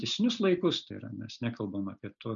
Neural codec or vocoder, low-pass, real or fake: none; 7.2 kHz; real